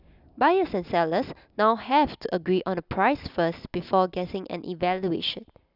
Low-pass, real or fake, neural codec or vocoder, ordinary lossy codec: 5.4 kHz; real; none; none